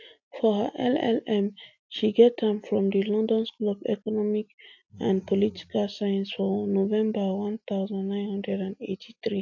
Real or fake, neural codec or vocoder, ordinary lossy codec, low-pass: real; none; AAC, 48 kbps; 7.2 kHz